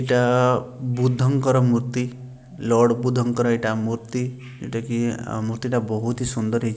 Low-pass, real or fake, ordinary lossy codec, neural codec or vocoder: none; real; none; none